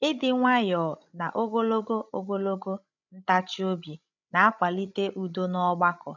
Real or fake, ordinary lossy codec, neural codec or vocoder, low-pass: fake; none; codec, 16 kHz, 16 kbps, FreqCodec, larger model; 7.2 kHz